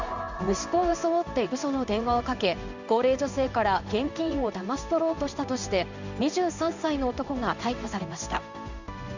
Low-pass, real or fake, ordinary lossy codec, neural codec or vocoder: 7.2 kHz; fake; none; codec, 16 kHz in and 24 kHz out, 1 kbps, XY-Tokenizer